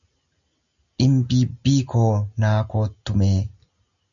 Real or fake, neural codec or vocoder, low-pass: real; none; 7.2 kHz